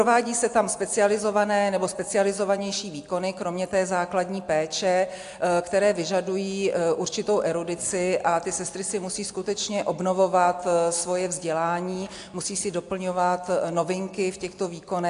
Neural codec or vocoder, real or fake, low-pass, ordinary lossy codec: none; real; 10.8 kHz; AAC, 64 kbps